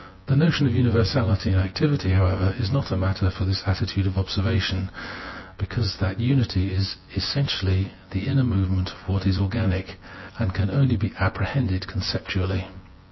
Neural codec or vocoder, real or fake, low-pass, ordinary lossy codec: vocoder, 24 kHz, 100 mel bands, Vocos; fake; 7.2 kHz; MP3, 24 kbps